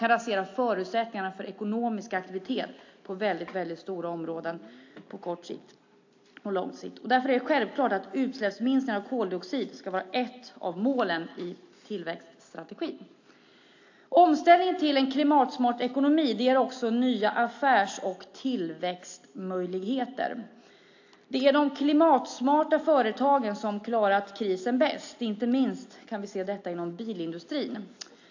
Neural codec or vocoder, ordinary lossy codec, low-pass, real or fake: none; AAC, 48 kbps; 7.2 kHz; real